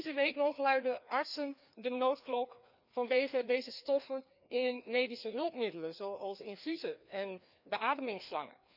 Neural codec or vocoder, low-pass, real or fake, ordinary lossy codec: codec, 16 kHz, 2 kbps, FreqCodec, larger model; 5.4 kHz; fake; none